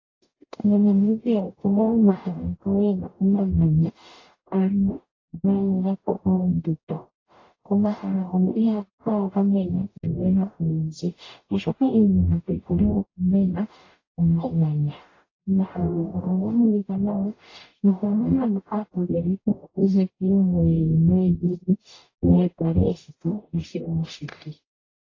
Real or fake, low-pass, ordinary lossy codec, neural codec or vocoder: fake; 7.2 kHz; AAC, 32 kbps; codec, 44.1 kHz, 0.9 kbps, DAC